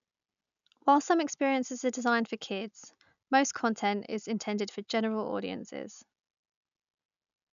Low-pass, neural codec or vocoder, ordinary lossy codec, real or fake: 7.2 kHz; none; none; real